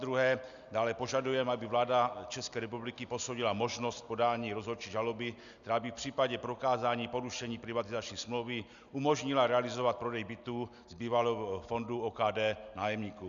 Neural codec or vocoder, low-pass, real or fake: none; 7.2 kHz; real